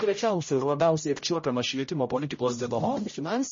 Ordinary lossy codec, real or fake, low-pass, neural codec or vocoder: MP3, 32 kbps; fake; 7.2 kHz; codec, 16 kHz, 0.5 kbps, X-Codec, HuBERT features, trained on general audio